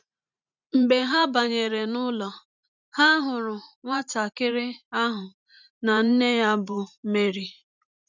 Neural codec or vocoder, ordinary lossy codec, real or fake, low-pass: vocoder, 44.1 kHz, 128 mel bands every 512 samples, BigVGAN v2; none; fake; 7.2 kHz